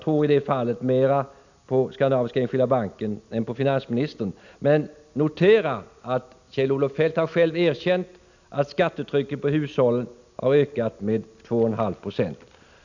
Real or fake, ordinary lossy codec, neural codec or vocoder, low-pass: real; none; none; 7.2 kHz